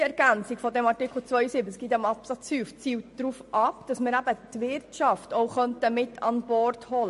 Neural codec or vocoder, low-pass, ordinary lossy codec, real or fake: none; 14.4 kHz; MP3, 48 kbps; real